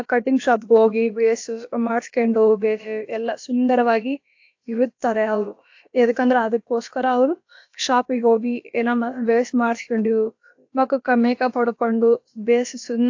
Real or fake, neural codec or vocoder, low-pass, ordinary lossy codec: fake; codec, 16 kHz, about 1 kbps, DyCAST, with the encoder's durations; 7.2 kHz; AAC, 48 kbps